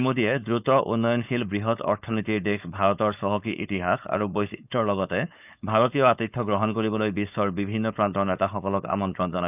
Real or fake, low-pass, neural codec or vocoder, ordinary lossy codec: fake; 3.6 kHz; codec, 16 kHz, 4.8 kbps, FACodec; none